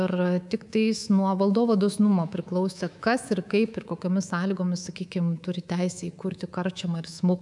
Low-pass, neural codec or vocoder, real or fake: 10.8 kHz; codec, 24 kHz, 3.1 kbps, DualCodec; fake